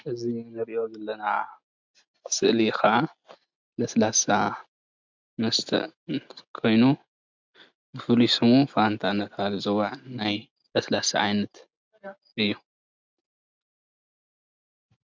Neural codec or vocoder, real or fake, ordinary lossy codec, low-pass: none; real; MP3, 64 kbps; 7.2 kHz